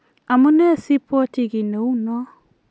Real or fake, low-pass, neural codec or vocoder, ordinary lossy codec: real; none; none; none